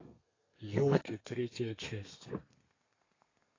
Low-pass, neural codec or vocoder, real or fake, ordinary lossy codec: 7.2 kHz; codec, 32 kHz, 1.9 kbps, SNAC; fake; AAC, 32 kbps